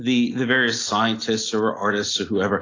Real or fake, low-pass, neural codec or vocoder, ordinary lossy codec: real; 7.2 kHz; none; AAC, 32 kbps